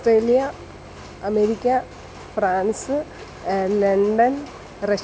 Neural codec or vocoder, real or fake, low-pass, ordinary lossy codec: none; real; none; none